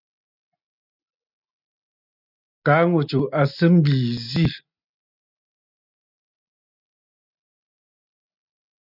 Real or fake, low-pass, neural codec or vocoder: real; 5.4 kHz; none